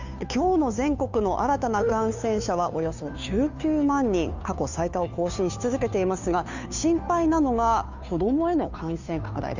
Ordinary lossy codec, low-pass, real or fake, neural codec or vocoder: none; 7.2 kHz; fake; codec, 16 kHz, 2 kbps, FunCodec, trained on Chinese and English, 25 frames a second